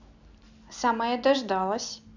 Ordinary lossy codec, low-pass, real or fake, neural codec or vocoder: none; 7.2 kHz; real; none